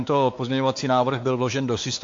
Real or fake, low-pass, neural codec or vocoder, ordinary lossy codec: fake; 7.2 kHz; codec, 16 kHz, 4 kbps, X-Codec, WavLM features, trained on Multilingual LibriSpeech; AAC, 48 kbps